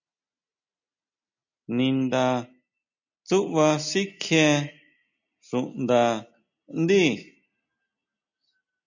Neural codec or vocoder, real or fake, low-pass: none; real; 7.2 kHz